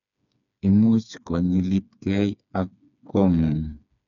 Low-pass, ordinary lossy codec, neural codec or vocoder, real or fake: 7.2 kHz; none; codec, 16 kHz, 4 kbps, FreqCodec, smaller model; fake